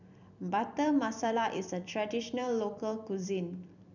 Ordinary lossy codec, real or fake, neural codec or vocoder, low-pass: none; fake; vocoder, 44.1 kHz, 128 mel bands every 256 samples, BigVGAN v2; 7.2 kHz